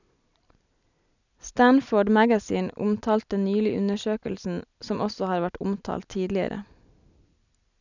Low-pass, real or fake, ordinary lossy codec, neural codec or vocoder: 7.2 kHz; real; none; none